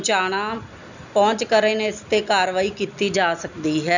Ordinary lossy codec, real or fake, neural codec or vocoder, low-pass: none; real; none; 7.2 kHz